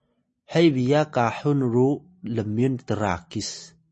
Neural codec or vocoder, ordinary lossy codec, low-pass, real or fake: none; MP3, 32 kbps; 10.8 kHz; real